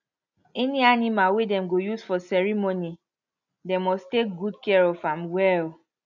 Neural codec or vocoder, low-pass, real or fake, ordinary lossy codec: none; 7.2 kHz; real; none